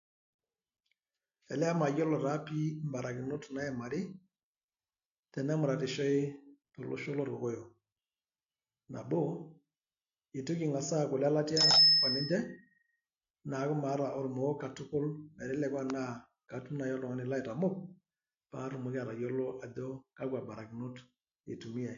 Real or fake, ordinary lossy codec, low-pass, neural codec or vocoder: real; AAC, 96 kbps; 7.2 kHz; none